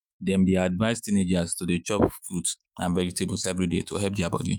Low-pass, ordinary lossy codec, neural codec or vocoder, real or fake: none; none; autoencoder, 48 kHz, 128 numbers a frame, DAC-VAE, trained on Japanese speech; fake